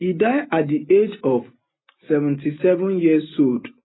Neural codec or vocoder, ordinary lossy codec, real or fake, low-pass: none; AAC, 16 kbps; real; 7.2 kHz